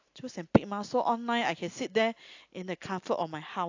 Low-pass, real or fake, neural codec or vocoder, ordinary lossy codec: 7.2 kHz; real; none; AAC, 48 kbps